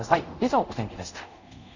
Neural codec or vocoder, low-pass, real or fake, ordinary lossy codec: codec, 24 kHz, 0.5 kbps, DualCodec; 7.2 kHz; fake; none